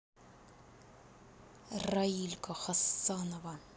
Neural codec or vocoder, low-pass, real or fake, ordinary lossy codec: none; none; real; none